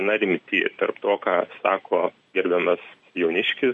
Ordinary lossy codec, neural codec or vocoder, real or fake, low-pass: MP3, 48 kbps; none; real; 10.8 kHz